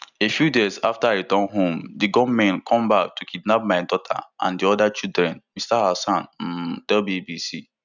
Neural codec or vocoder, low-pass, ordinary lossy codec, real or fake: none; 7.2 kHz; none; real